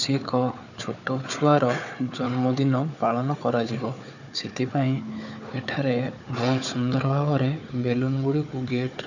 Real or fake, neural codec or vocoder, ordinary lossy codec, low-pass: fake; codec, 16 kHz, 4 kbps, FunCodec, trained on Chinese and English, 50 frames a second; none; 7.2 kHz